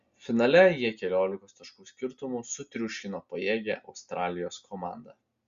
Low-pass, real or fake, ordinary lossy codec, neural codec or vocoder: 7.2 kHz; real; Opus, 64 kbps; none